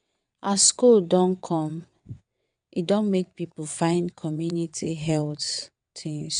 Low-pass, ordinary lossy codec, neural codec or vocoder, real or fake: 9.9 kHz; none; vocoder, 22.05 kHz, 80 mel bands, WaveNeXt; fake